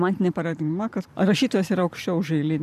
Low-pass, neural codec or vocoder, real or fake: 14.4 kHz; vocoder, 44.1 kHz, 128 mel bands every 512 samples, BigVGAN v2; fake